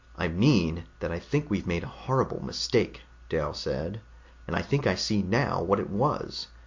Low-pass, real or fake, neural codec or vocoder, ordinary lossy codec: 7.2 kHz; real; none; MP3, 48 kbps